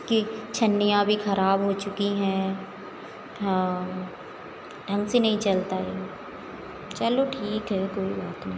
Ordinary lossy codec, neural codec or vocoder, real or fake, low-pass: none; none; real; none